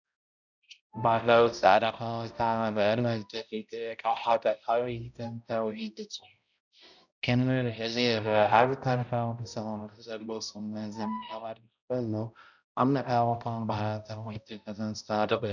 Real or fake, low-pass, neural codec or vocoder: fake; 7.2 kHz; codec, 16 kHz, 0.5 kbps, X-Codec, HuBERT features, trained on balanced general audio